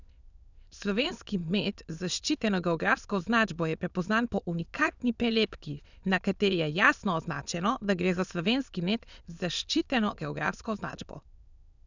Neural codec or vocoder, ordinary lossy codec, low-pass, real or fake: autoencoder, 22.05 kHz, a latent of 192 numbers a frame, VITS, trained on many speakers; none; 7.2 kHz; fake